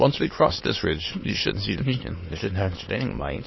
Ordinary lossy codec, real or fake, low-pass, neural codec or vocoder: MP3, 24 kbps; fake; 7.2 kHz; autoencoder, 22.05 kHz, a latent of 192 numbers a frame, VITS, trained on many speakers